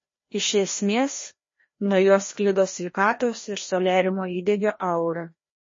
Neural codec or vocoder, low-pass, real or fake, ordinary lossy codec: codec, 16 kHz, 1 kbps, FreqCodec, larger model; 7.2 kHz; fake; MP3, 32 kbps